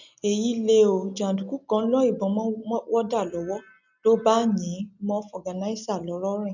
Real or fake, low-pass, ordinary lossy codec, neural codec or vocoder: real; 7.2 kHz; none; none